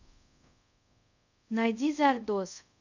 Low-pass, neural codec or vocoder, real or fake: 7.2 kHz; codec, 24 kHz, 0.5 kbps, DualCodec; fake